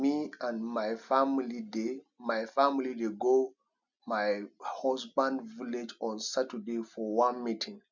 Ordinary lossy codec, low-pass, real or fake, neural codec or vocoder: none; 7.2 kHz; real; none